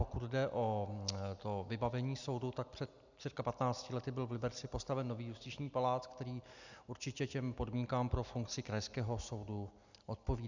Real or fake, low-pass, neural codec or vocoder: real; 7.2 kHz; none